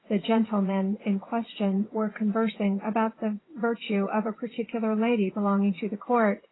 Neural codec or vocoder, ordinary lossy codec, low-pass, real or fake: vocoder, 22.05 kHz, 80 mel bands, WaveNeXt; AAC, 16 kbps; 7.2 kHz; fake